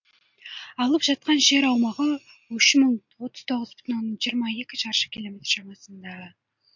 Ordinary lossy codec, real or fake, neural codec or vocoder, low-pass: MP3, 48 kbps; real; none; 7.2 kHz